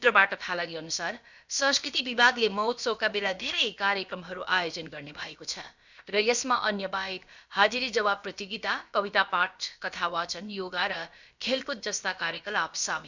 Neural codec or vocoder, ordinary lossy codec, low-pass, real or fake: codec, 16 kHz, about 1 kbps, DyCAST, with the encoder's durations; none; 7.2 kHz; fake